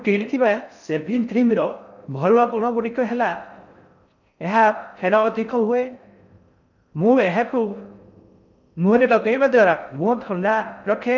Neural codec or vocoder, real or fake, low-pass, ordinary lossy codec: codec, 16 kHz in and 24 kHz out, 0.6 kbps, FocalCodec, streaming, 4096 codes; fake; 7.2 kHz; none